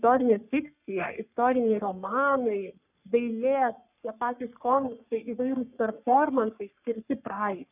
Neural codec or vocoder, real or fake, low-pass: codec, 44.1 kHz, 3.4 kbps, Pupu-Codec; fake; 3.6 kHz